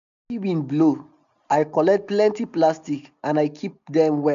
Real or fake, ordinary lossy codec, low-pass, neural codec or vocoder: real; none; 7.2 kHz; none